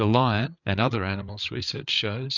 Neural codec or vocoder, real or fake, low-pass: codec, 16 kHz, 8 kbps, FreqCodec, larger model; fake; 7.2 kHz